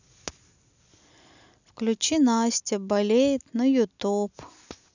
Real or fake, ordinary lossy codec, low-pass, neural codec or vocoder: real; none; 7.2 kHz; none